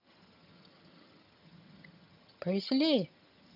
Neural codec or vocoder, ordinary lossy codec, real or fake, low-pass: vocoder, 22.05 kHz, 80 mel bands, HiFi-GAN; none; fake; 5.4 kHz